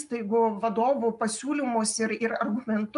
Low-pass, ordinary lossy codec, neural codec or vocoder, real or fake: 10.8 kHz; AAC, 96 kbps; none; real